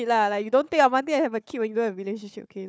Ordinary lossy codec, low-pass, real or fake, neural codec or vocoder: none; none; fake; codec, 16 kHz, 4 kbps, FunCodec, trained on Chinese and English, 50 frames a second